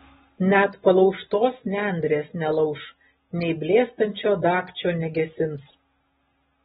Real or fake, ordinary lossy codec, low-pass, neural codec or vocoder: real; AAC, 16 kbps; 19.8 kHz; none